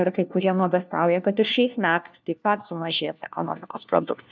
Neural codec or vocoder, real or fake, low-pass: codec, 16 kHz, 1 kbps, FunCodec, trained on LibriTTS, 50 frames a second; fake; 7.2 kHz